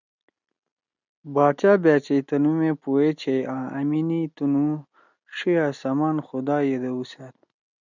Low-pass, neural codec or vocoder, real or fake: 7.2 kHz; none; real